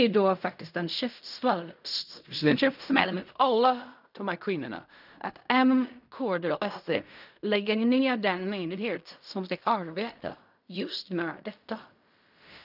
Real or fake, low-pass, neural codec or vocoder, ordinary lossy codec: fake; 5.4 kHz; codec, 16 kHz in and 24 kHz out, 0.4 kbps, LongCat-Audio-Codec, fine tuned four codebook decoder; none